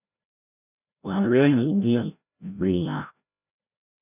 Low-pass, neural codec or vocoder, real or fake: 3.6 kHz; codec, 16 kHz, 0.5 kbps, FreqCodec, larger model; fake